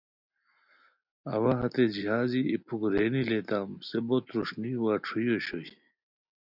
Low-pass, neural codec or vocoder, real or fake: 5.4 kHz; none; real